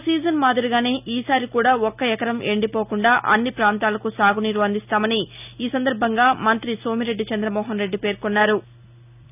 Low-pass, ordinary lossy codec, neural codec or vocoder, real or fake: 3.6 kHz; none; none; real